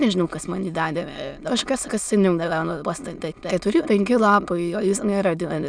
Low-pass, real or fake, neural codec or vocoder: 9.9 kHz; fake; autoencoder, 22.05 kHz, a latent of 192 numbers a frame, VITS, trained on many speakers